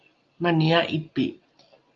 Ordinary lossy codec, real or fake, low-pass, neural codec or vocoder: Opus, 24 kbps; real; 7.2 kHz; none